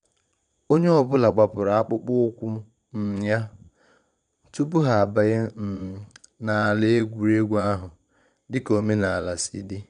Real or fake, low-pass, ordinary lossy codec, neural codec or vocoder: fake; 9.9 kHz; none; vocoder, 22.05 kHz, 80 mel bands, Vocos